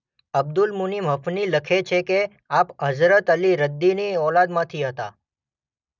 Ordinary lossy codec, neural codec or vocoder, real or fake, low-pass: none; none; real; 7.2 kHz